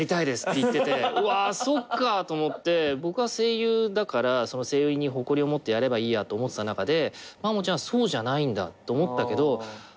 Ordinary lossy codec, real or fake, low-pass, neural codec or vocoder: none; real; none; none